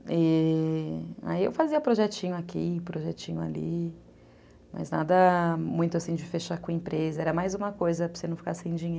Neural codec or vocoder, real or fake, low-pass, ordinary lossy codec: none; real; none; none